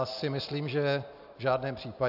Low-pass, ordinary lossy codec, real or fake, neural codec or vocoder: 5.4 kHz; AAC, 48 kbps; real; none